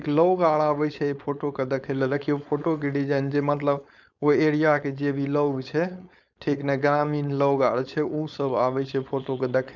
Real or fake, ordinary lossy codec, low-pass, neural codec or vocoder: fake; none; 7.2 kHz; codec, 16 kHz, 4.8 kbps, FACodec